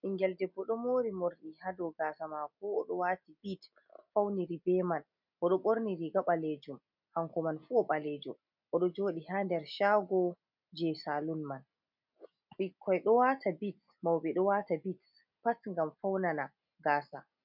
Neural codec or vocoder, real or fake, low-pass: none; real; 5.4 kHz